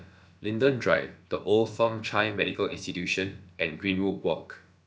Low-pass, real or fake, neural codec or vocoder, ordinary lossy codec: none; fake; codec, 16 kHz, about 1 kbps, DyCAST, with the encoder's durations; none